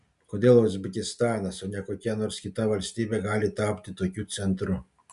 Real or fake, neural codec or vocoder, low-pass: real; none; 10.8 kHz